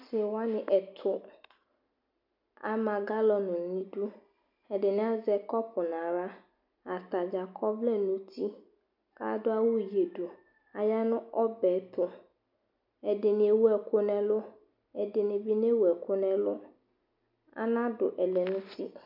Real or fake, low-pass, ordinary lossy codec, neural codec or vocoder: real; 5.4 kHz; MP3, 48 kbps; none